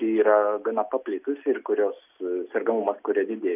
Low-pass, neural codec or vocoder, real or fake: 3.6 kHz; none; real